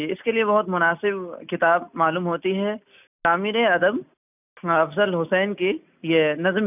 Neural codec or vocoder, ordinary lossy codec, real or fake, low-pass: none; none; real; 3.6 kHz